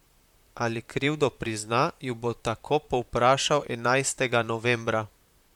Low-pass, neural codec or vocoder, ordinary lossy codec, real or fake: 19.8 kHz; vocoder, 44.1 kHz, 128 mel bands, Pupu-Vocoder; MP3, 96 kbps; fake